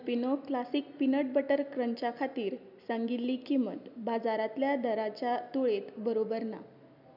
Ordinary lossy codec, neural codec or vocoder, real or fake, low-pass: none; none; real; 5.4 kHz